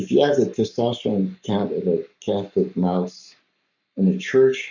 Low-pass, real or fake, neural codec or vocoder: 7.2 kHz; fake; codec, 44.1 kHz, 7.8 kbps, Pupu-Codec